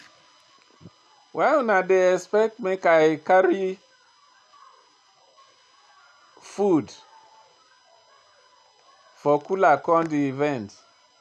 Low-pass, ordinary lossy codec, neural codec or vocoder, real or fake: none; none; none; real